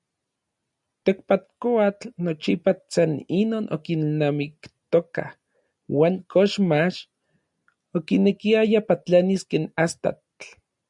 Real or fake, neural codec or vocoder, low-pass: real; none; 10.8 kHz